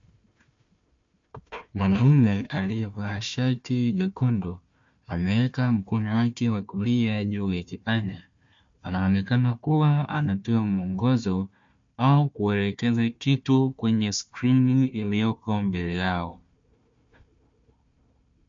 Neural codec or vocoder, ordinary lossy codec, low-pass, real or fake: codec, 16 kHz, 1 kbps, FunCodec, trained on Chinese and English, 50 frames a second; MP3, 48 kbps; 7.2 kHz; fake